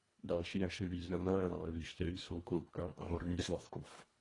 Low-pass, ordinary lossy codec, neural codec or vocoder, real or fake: 10.8 kHz; AAC, 32 kbps; codec, 24 kHz, 1.5 kbps, HILCodec; fake